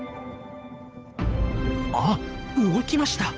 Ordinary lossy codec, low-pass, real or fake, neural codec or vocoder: none; none; fake; codec, 16 kHz, 8 kbps, FunCodec, trained on Chinese and English, 25 frames a second